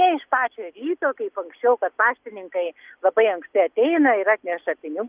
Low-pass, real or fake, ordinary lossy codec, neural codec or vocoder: 3.6 kHz; real; Opus, 24 kbps; none